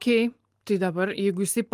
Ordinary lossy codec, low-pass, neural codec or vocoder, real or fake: Opus, 32 kbps; 14.4 kHz; none; real